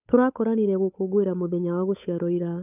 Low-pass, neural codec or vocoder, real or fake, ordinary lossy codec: 3.6 kHz; codec, 16 kHz, 8 kbps, FunCodec, trained on Chinese and English, 25 frames a second; fake; none